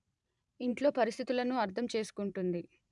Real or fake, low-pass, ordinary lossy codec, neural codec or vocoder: fake; 10.8 kHz; none; vocoder, 44.1 kHz, 128 mel bands every 512 samples, BigVGAN v2